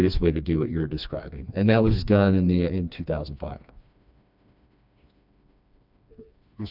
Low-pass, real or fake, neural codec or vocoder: 5.4 kHz; fake; codec, 16 kHz, 2 kbps, FreqCodec, smaller model